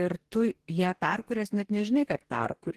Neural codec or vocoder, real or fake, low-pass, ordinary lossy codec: codec, 44.1 kHz, 2.6 kbps, DAC; fake; 14.4 kHz; Opus, 16 kbps